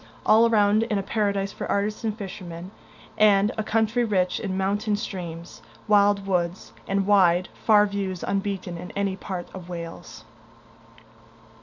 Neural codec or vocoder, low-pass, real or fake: none; 7.2 kHz; real